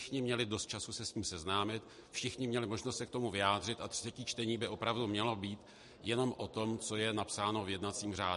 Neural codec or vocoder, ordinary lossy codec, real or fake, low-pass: none; MP3, 48 kbps; real; 14.4 kHz